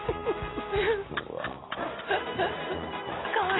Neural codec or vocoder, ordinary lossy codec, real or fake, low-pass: none; AAC, 16 kbps; real; 7.2 kHz